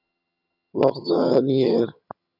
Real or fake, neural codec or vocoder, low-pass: fake; vocoder, 22.05 kHz, 80 mel bands, HiFi-GAN; 5.4 kHz